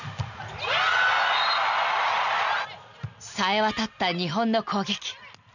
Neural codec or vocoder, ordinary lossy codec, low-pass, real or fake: none; none; 7.2 kHz; real